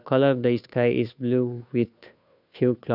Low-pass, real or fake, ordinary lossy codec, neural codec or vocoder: 5.4 kHz; fake; none; codec, 16 kHz, 2 kbps, FunCodec, trained on Chinese and English, 25 frames a second